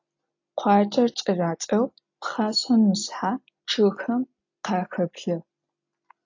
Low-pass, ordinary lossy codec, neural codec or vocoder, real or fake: 7.2 kHz; AAC, 48 kbps; none; real